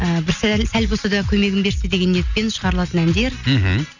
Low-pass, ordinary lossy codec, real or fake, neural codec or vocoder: 7.2 kHz; none; real; none